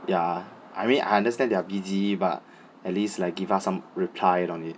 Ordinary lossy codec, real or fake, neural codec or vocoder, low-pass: none; real; none; none